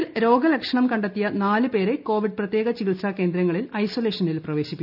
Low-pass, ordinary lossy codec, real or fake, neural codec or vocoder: 5.4 kHz; AAC, 48 kbps; real; none